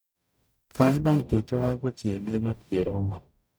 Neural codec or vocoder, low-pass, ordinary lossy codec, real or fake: codec, 44.1 kHz, 0.9 kbps, DAC; none; none; fake